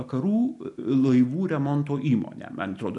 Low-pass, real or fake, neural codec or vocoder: 10.8 kHz; real; none